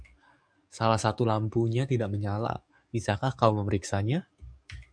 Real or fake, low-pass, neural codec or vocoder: fake; 9.9 kHz; codec, 44.1 kHz, 7.8 kbps, DAC